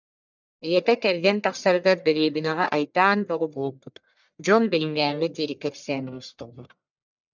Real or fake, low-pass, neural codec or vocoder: fake; 7.2 kHz; codec, 44.1 kHz, 1.7 kbps, Pupu-Codec